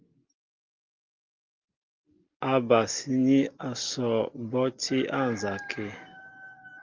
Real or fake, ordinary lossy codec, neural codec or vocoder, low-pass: real; Opus, 32 kbps; none; 7.2 kHz